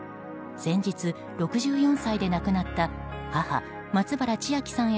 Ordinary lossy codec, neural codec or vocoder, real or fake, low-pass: none; none; real; none